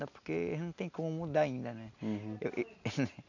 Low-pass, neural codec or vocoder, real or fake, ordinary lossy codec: 7.2 kHz; none; real; none